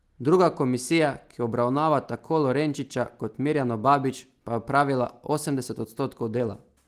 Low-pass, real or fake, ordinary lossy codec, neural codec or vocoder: 14.4 kHz; real; Opus, 24 kbps; none